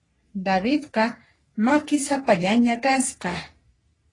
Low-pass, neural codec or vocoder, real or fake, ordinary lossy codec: 10.8 kHz; codec, 44.1 kHz, 3.4 kbps, Pupu-Codec; fake; AAC, 32 kbps